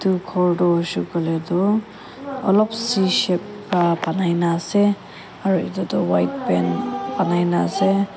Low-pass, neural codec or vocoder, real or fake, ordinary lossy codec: none; none; real; none